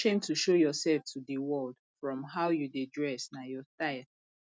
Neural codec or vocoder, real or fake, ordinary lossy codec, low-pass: none; real; none; none